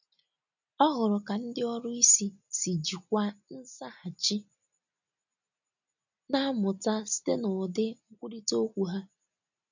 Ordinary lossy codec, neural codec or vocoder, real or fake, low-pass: none; none; real; 7.2 kHz